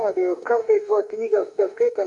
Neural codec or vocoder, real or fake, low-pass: autoencoder, 48 kHz, 32 numbers a frame, DAC-VAE, trained on Japanese speech; fake; 10.8 kHz